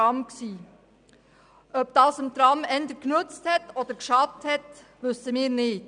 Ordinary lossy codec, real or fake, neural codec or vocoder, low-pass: none; real; none; 9.9 kHz